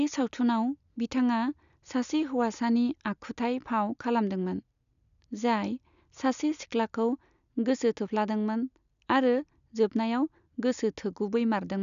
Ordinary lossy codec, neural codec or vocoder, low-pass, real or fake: none; none; 7.2 kHz; real